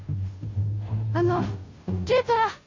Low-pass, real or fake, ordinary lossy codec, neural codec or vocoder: 7.2 kHz; fake; MP3, 32 kbps; codec, 16 kHz, 0.5 kbps, FunCodec, trained on Chinese and English, 25 frames a second